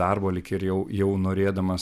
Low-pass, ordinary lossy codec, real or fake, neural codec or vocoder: 14.4 kHz; AAC, 96 kbps; real; none